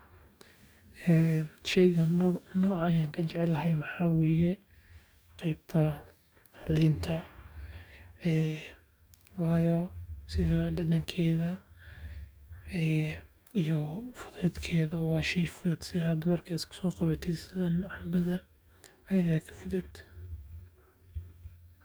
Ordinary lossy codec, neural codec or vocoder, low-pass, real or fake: none; codec, 44.1 kHz, 2.6 kbps, DAC; none; fake